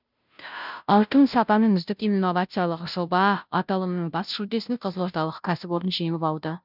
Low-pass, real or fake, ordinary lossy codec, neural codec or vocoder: 5.4 kHz; fake; none; codec, 16 kHz, 0.5 kbps, FunCodec, trained on Chinese and English, 25 frames a second